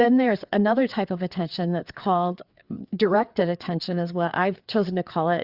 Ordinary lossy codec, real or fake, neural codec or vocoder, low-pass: Opus, 64 kbps; fake; codec, 16 kHz, 4 kbps, FreqCodec, larger model; 5.4 kHz